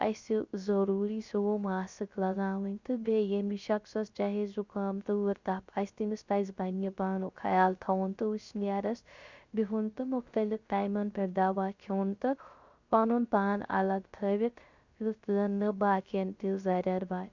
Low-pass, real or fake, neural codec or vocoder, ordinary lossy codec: 7.2 kHz; fake; codec, 16 kHz, 0.3 kbps, FocalCodec; Opus, 64 kbps